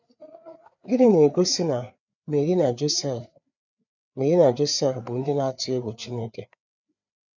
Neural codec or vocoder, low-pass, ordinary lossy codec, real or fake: codec, 16 kHz, 4 kbps, FreqCodec, larger model; 7.2 kHz; none; fake